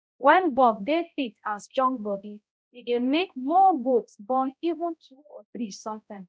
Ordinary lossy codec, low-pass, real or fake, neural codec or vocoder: none; none; fake; codec, 16 kHz, 0.5 kbps, X-Codec, HuBERT features, trained on balanced general audio